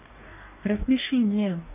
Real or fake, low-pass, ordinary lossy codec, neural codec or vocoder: fake; 3.6 kHz; none; codec, 44.1 kHz, 2.6 kbps, DAC